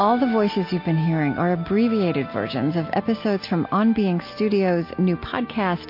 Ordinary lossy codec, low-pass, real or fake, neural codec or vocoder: MP3, 32 kbps; 5.4 kHz; real; none